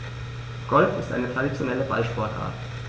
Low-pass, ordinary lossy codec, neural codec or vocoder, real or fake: none; none; none; real